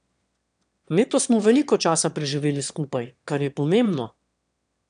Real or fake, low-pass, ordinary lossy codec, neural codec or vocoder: fake; 9.9 kHz; none; autoencoder, 22.05 kHz, a latent of 192 numbers a frame, VITS, trained on one speaker